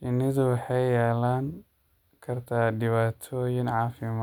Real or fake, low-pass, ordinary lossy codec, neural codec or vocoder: real; 19.8 kHz; none; none